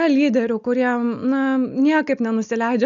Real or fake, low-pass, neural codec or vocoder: real; 7.2 kHz; none